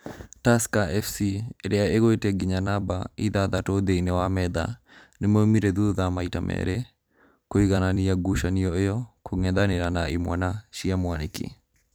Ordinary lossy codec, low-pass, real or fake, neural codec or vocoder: none; none; fake; vocoder, 44.1 kHz, 128 mel bands every 256 samples, BigVGAN v2